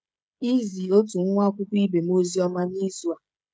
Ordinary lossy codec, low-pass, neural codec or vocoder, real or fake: none; none; codec, 16 kHz, 16 kbps, FreqCodec, smaller model; fake